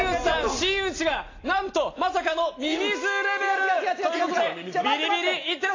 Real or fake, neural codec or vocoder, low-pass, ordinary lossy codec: real; none; 7.2 kHz; AAC, 32 kbps